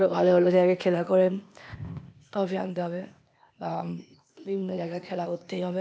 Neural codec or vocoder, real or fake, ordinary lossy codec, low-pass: codec, 16 kHz, 0.8 kbps, ZipCodec; fake; none; none